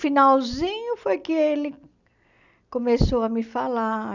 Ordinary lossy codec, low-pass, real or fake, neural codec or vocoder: none; 7.2 kHz; real; none